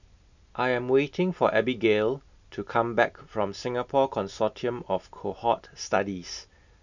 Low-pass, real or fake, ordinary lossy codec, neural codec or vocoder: 7.2 kHz; real; none; none